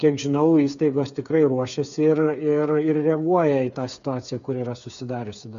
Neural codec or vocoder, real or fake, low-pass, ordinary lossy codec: codec, 16 kHz, 8 kbps, FreqCodec, smaller model; fake; 7.2 kHz; MP3, 96 kbps